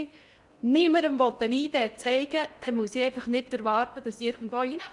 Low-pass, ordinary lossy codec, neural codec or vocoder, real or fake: 10.8 kHz; Opus, 64 kbps; codec, 16 kHz in and 24 kHz out, 0.8 kbps, FocalCodec, streaming, 65536 codes; fake